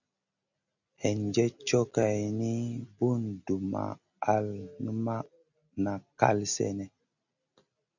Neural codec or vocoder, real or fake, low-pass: none; real; 7.2 kHz